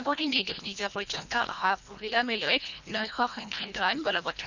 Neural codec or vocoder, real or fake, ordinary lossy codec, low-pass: codec, 24 kHz, 1.5 kbps, HILCodec; fake; none; 7.2 kHz